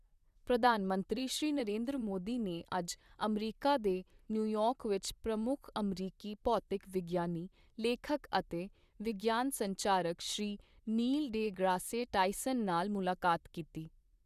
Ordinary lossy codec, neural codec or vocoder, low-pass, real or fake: Opus, 64 kbps; vocoder, 44.1 kHz, 128 mel bands, Pupu-Vocoder; 14.4 kHz; fake